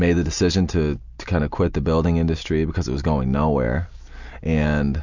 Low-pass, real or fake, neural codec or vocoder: 7.2 kHz; real; none